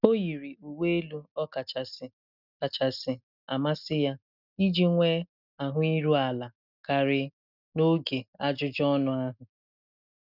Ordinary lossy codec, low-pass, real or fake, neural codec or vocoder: none; 5.4 kHz; real; none